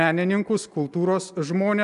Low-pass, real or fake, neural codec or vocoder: 10.8 kHz; real; none